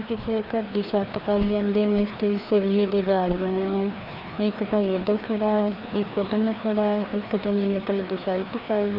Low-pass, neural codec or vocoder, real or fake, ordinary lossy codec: 5.4 kHz; codec, 16 kHz, 2 kbps, FreqCodec, larger model; fake; none